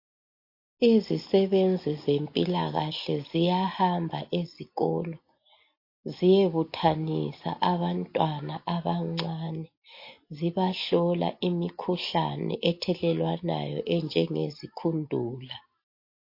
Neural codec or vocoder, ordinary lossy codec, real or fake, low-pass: none; MP3, 32 kbps; real; 5.4 kHz